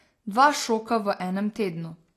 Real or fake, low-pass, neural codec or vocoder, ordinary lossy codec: real; 14.4 kHz; none; AAC, 48 kbps